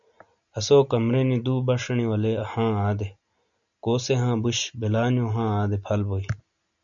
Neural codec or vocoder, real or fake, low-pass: none; real; 7.2 kHz